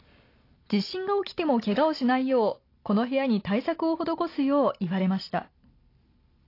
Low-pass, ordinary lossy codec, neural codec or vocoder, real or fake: 5.4 kHz; AAC, 32 kbps; none; real